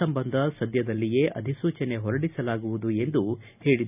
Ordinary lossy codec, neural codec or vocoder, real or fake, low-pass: none; none; real; 3.6 kHz